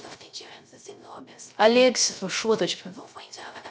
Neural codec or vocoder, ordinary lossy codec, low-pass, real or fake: codec, 16 kHz, 0.3 kbps, FocalCodec; none; none; fake